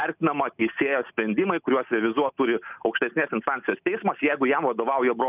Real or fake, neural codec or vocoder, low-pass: real; none; 3.6 kHz